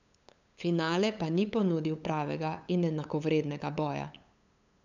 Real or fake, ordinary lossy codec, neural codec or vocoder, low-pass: fake; none; codec, 16 kHz, 8 kbps, FunCodec, trained on LibriTTS, 25 frames a second; 7.2 kHz